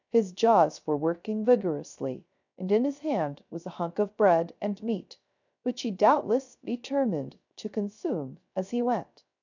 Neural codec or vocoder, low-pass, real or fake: codec, 16 kHz, 0.3 kbps, FocalCodec; 7.2 kHz; fake